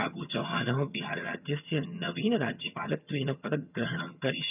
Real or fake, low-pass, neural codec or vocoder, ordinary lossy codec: fake; 3.6 kHz; vocoder, 22.05 kHz, 80 mel bands, HiFi-GAN; none